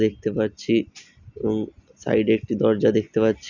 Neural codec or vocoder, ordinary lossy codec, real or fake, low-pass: none; none; real; 7.2 kHz